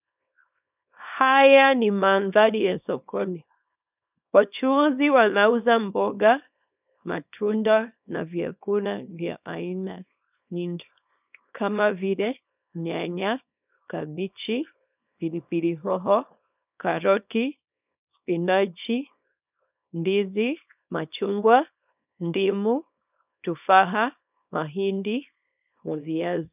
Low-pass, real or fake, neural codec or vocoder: 3.6 kHz; fake; codec, 24 kHz, 0.9 kbps, WavTokenizer, small release